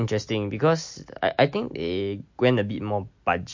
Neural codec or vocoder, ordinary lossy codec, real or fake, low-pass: none; MP3, 48 kbps; real; 7.2 kHz